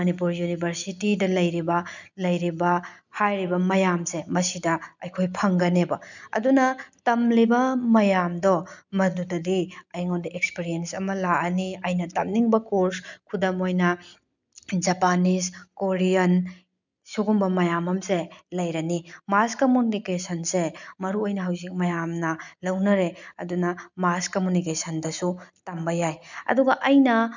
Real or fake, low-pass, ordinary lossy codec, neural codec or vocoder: real; 7.2 kHz; none; none